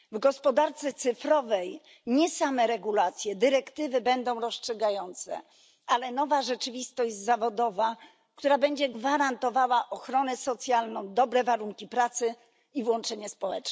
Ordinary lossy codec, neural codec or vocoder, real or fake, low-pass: none; none; real; none